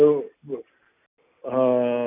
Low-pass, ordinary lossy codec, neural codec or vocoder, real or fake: 3.6 kHz; none; none; real